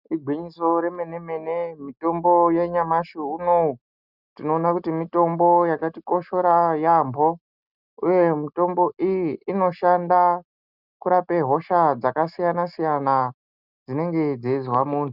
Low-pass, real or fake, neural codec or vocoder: 5.4 kHz; real; none